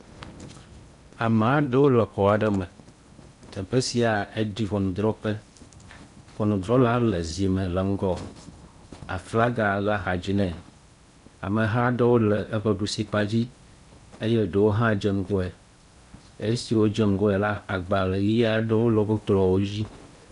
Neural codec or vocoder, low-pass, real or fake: codec, 16 kHz in and 24 kHz out, 0.8 kbps, FocalCodec, streaming, 65536 codes; 10.8 kHz; fake